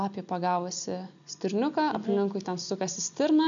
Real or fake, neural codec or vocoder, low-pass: real; none; 7.2 kHz